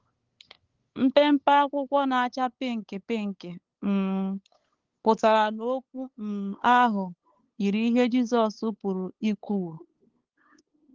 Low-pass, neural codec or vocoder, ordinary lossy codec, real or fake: 7.2 kHz; codec, 16 kHz, 8 kbps, FunCodec, trained on LibriTTS, 25 frames a second; Opus, 16 kbps; fake